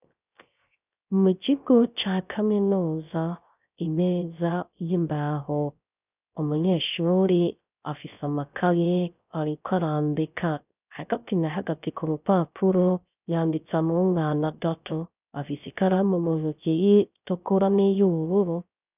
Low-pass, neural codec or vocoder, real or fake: 3.6 kHz; codec, 16 kHz, 0.3 kbps, FocalCodec; fake